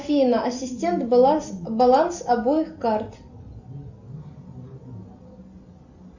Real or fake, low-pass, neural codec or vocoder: real; 7.2 kHz; none